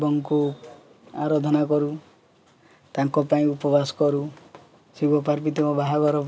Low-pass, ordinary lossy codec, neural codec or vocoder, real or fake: none; none; none; real